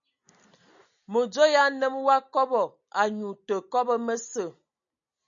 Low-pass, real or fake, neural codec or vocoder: 7.2 kHz; real; none